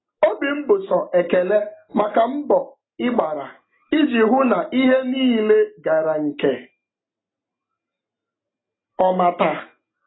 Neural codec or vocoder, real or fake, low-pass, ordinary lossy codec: none; real; 7.2 kHz; AAC, 16 kbps